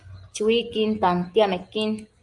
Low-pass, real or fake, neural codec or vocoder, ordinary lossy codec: 10.8 kHz; real; none; Opus, 32 kbps